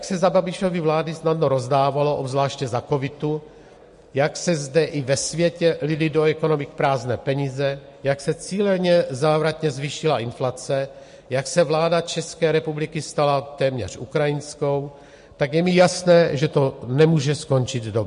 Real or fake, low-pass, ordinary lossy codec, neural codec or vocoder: real; 10.8 kHz; MP3, 48 kbps; none